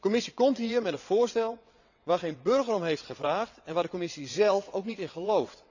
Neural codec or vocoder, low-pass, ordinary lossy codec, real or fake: vocoder, 22.05 kHz, 80 mel bands, WaveNeXt; 7.2 kHz; none; fake